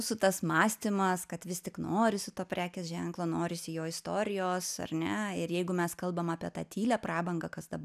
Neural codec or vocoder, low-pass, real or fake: none; 14.4 kHz; real